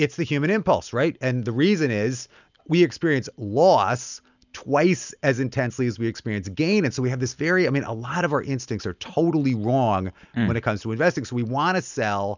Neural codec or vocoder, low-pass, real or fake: none; 7.2 kHz; real